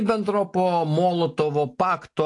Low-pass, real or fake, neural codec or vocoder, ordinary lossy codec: 10.8 kHz; real; none; AAC, 48 kbps